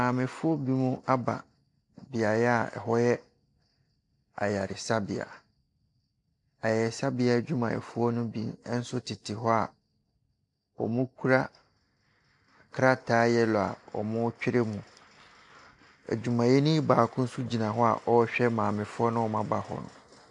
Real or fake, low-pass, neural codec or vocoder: real; 10.8 kHz; none